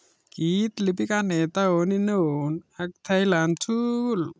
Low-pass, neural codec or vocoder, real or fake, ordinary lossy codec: none; none; real; none